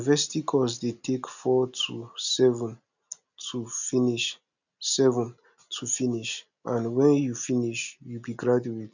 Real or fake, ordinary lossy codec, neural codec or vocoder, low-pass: real; none; none; 7.2 kHz